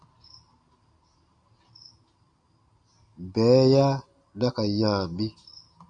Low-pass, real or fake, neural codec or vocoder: 9.9 kHz; real; none